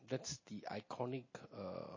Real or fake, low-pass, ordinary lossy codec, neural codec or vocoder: real; 7.2 kHz; MP3, 32 kbps; none